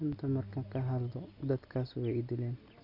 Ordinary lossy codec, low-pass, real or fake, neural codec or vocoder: none; 5.4 kHz; real; none